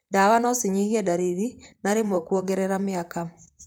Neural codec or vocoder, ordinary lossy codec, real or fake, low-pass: vocoder, 44.1 kHz, 128 mel bands, Pupu-Vocoder; none; fake; none